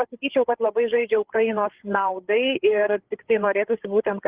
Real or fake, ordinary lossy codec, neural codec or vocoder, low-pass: fake; Opus, 16 kbps; codec, 16 kHz, 8 kbps, FreqCodec, larger model; 3.6 kHz